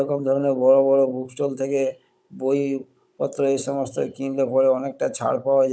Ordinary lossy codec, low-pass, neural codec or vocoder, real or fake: none; none; codec, 16 kHz, 16 kbps, FunCodec, trained on Chinese and English, 50 frames a second; fake